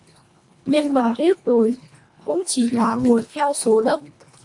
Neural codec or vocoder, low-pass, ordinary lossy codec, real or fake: codec, 24 kHz, 1.5 kbps, HILCodec; 10.8 kHz; MP3, 64 kbps; fake